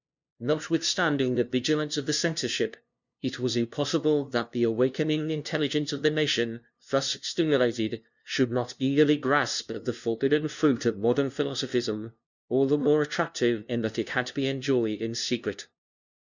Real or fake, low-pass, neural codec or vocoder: fake; 7.2 kHz; codec, 16 kHz, 0.5 kbps, FunCodec, trained on LibriTTS, 25 frames a second